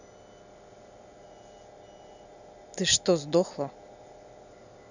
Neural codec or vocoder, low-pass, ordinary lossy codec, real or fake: none; 7.2 kHz; none; real